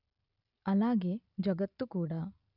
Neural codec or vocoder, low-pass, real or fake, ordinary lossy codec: none; 5.4 kHz; real; none